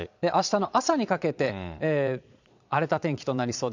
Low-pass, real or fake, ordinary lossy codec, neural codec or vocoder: 7.2 kHz; fake; none; vocoder, 22.05 kHz, 80 mel bands, Vocos